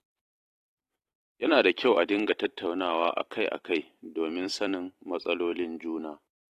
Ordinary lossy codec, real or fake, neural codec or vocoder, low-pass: AAC, 48 kbps; fake; vocoder, 44.1 kHz, 128 mel bands every 256 samples, BigVGAN v2; 14.4 kHz